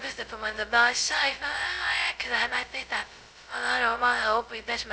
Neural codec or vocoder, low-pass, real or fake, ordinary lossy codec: codec, 16 kHz, 0.2 kbps, FocalCodec; none; fake; none